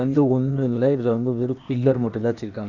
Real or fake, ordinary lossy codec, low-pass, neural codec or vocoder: fake; none; 7.2 kHz; codec, 16 kHz, 0.8 kbps, ZipCodec